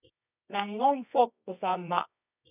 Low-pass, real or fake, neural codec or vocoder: 3.6 kHz; fake; codec, 24 kHz, 0.9 kbps, WavTokenizer, medium music audio release